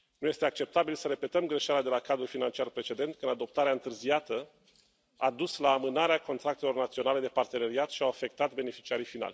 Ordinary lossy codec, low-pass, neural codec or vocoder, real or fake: none; none; none; real